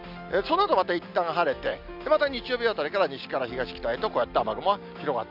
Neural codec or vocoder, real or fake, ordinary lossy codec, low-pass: none; real; none; 5.4 kHz